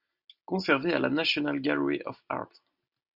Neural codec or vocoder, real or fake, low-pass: none; real; 5.4 kHz